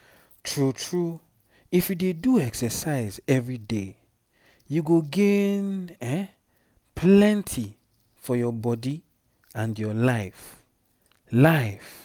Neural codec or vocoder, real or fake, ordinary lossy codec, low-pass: none; real; none; 19.8 kHz